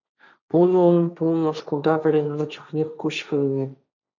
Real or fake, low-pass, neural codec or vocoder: fake; 7.2 kHz; codec, 16 kHz, 1.1 kbps, Voila-Tokenizer